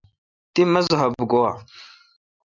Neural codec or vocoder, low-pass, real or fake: none; 7.2 kHz; real